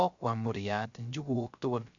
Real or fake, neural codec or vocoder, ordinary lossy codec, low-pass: fake; codec, 16 kHz, 0.3 kbps, FocalCodec; none; 7.2 kHz